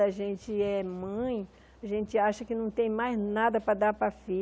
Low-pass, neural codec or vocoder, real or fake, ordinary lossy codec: none; none; real; none